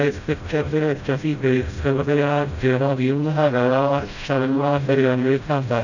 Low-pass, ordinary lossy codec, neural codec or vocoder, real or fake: 7.2 kHz; none; codec, 16 kHz, 0.5 kbps, FreqCodec, smaller model; fake